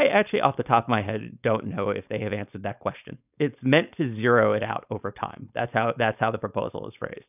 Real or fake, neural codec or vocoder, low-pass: fake; codec, 16 kHz, 4.8 kbps, FACodec; 3.6 kHz